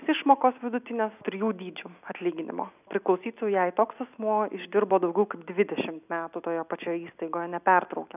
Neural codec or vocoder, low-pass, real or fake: none; 3.6 kHz; real